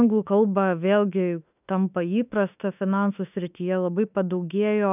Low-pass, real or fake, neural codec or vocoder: 3.6 kHz; fake; autoencoder, 48 kHz, 32 numbers a frame, DAC-VAE, trained on Japanese speech